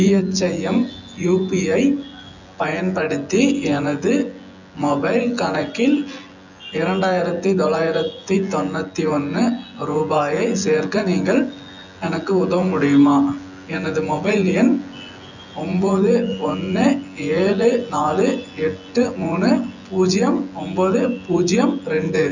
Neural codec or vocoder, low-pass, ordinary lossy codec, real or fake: vocoder, 24 kHz, 100 mel bands, Vocos; 7.2 kHz; none; fake